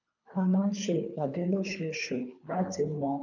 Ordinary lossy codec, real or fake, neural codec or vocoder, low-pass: none; fake; codec, 24 kHz, 3 kbps, HILCodec; 7.2 kHz